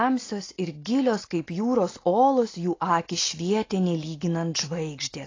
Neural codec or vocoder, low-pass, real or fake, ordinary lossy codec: none; 7.2 kHz; real; AAC, 32 kbps